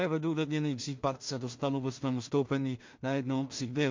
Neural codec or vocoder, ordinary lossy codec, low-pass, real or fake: codec, 16 kHz in and 24 kHz out, 0.4 kbps, LongCat-Audio-Codec, two codebook decoder; MP3, 48 kbps; 7.2 kHz; fake